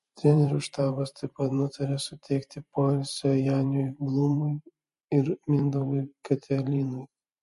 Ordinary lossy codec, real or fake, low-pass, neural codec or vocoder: MP3, 48 kbps; fake; 14.4 kHz; vocoder, 44.1 kHz, 128 mel bands every 512 samples, BigVGAN v2